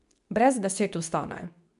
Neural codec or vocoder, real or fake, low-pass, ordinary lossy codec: codec, 24 kHz, 0.9 kbps, WavTokenizer, medium speech release version 2; fake; 10.8 kHz; none